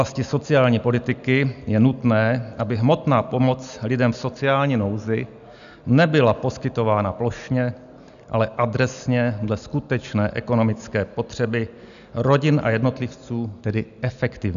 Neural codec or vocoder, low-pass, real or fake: none; 7.2 kHz; real